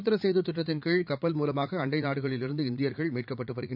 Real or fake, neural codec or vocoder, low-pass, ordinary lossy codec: fake; vocoder, 44.1 kHz, 80 mel bands, Vocos; 5.4 kHz; none